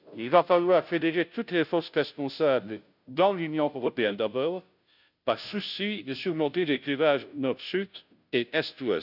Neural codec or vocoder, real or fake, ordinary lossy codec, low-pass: codec, 16 kHz, 0.5 kbps, FunCodec, trained on Chinese and English, 25 frames a second; fake; none; 5.4 kHz